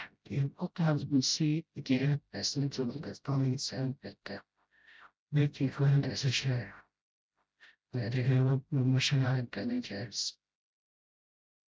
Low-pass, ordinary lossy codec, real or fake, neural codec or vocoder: none; none; fake; codec, 16 kHz, 0.5 kbps, FreqCodec, smaller model